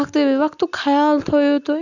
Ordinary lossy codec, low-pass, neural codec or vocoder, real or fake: none; 7.2 kHz; none; real